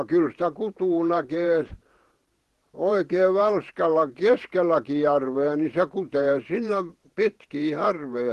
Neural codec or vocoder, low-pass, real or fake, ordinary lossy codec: vocoder, 48 kHz, 128 mel bands, Vocos; 14.4 kHz; fake; Opus, 16 kbps